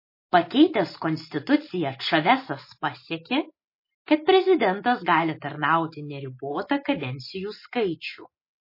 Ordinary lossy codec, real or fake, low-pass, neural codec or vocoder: MP3, 24 kbps; real; 5.4 kHz; none